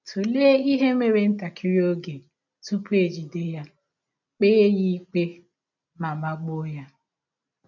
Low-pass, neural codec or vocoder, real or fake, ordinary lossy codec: 7.2 kHz; none; real; none